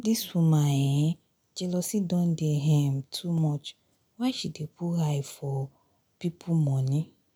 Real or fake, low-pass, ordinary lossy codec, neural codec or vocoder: real; 19.8 kHz; none; none